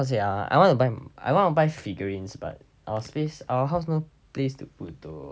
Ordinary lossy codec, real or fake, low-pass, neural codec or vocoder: none; real; none; none